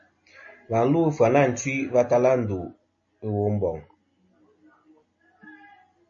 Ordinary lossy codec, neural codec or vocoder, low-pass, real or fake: MP3, 32 kbps; none; 7.2 kHz; real